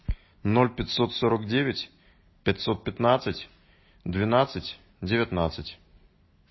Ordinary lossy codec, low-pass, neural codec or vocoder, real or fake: MP3, 24 kbps; 7.2 kHz; none; real